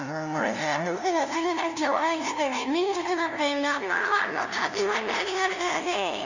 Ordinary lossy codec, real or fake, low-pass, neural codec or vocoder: none; fake; 7.2 kHz; codec, 16 kHz, 0.5 kbps, FunCodec, trained on LibriTTS, 25 frames a second